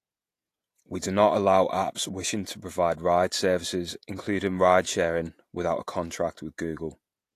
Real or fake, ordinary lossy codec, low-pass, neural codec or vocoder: fake; AAC, 64 kbps; 14.4 kHz; vocoder, 48 kHz, 128 mel bands, Vocos